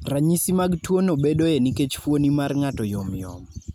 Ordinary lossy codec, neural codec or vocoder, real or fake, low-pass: none; none; real; none